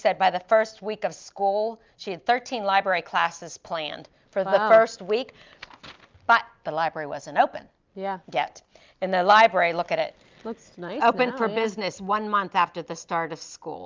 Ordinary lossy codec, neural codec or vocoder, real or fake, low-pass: Opus, 24 kbps; none; real; 7.2 kHz